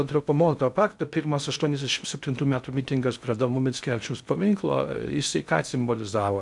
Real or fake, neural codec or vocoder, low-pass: fake; codec, 16 kHz in and 24 kHz out, 0.6 kbps, FocalCodec, streaming, 2048 codes; 10.8 kHz